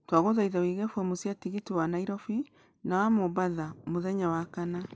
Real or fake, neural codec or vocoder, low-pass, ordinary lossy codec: real; none; none; none